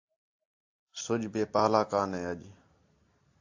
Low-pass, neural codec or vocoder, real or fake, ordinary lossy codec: 7.2 kHz; none; real; AAC, 48 kbps